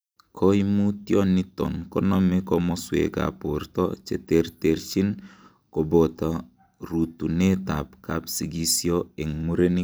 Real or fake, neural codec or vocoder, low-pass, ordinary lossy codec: real; none; none; none